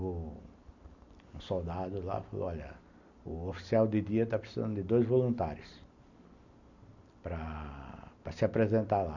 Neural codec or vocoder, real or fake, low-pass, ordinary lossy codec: none; real; 7.2 kHz; none